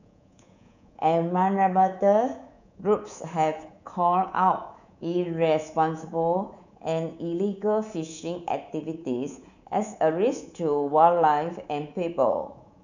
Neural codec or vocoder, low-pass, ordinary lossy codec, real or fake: codec, 24 kHz, 3.1 kbps, DualCodec; 7.2 kHz; none; fake